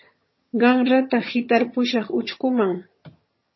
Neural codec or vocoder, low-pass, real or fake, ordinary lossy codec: vocoder, 22.05 kHz, 80 mel bands, HiFi-GAN; 7.2 kHz; fake; MP3, 24 kbps